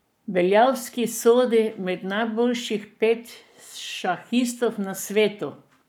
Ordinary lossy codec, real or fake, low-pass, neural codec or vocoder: none; fake; none; codec, 44.1 kHz, 7.8 kbps, Pupu-Codec